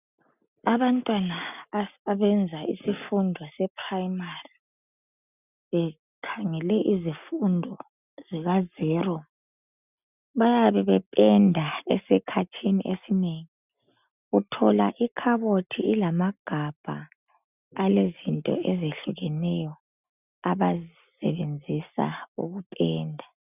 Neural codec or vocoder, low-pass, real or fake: none; 3.6 kHz; real